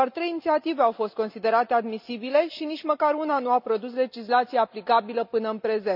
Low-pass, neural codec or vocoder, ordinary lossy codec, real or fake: 5.4 kHz; none; none; real